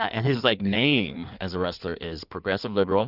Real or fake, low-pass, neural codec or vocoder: fake; 5.4 kHz; codec, 16 kHz in and 24 kHz out, 1.1 kbps, FireRedTTS-2 codec